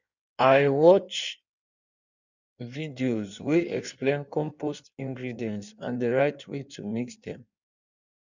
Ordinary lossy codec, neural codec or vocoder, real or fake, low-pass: none; codec, 16 kHz in and 24 kHz out, 1.1 kbps, FireRedTTS-2 codec; fake; 7.2 kHz